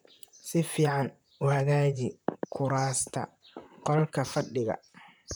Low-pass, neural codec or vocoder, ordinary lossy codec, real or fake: none; vocoder, 44.1 kHz, 128 mel bands, Pupu-Vocoder; none; fake